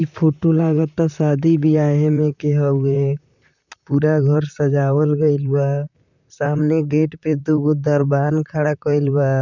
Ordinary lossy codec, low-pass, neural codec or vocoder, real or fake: none; 7.2 kHz; vocoder, 44.1 kHz, 128 mel bands, Pupu-Vocoder; fake